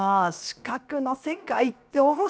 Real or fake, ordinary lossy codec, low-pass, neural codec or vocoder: fake; none; none; codec, 16 kHz, 0.7 kbps, FocalCodec